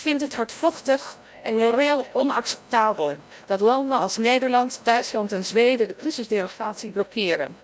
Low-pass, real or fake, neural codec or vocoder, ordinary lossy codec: none; fake; codec, 16 kHz, 0.5 kbps, FreqCodec, larger model; none